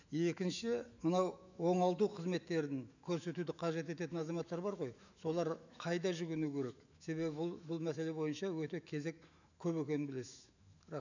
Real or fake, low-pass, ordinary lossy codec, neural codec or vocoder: fake; 7.2 kHz; none; autoencoder, 48 kHz, 128 numbers a frame, DAC-VAE, trained on Japanese speech